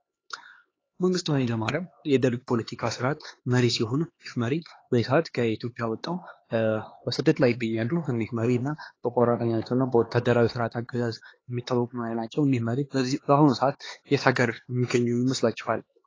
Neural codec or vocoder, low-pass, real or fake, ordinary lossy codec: codec, 16 kHz, 2 kbps, X-Codec, HuBERT features, trained on LibriSpeech; 7.2 kHz; fake; AAC, 32 kbps